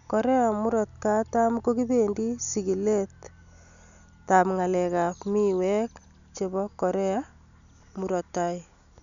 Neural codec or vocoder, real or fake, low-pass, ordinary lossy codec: none; real; 7.2 kHz; none